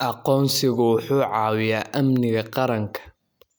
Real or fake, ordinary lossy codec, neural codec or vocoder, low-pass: real; none; none; none